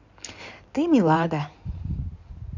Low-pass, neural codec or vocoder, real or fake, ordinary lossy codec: 7.2 kHz; codec, 16 kHz in and 24 kHz out, 2.2 kbps, FireRedTTS-2 codec; fake; none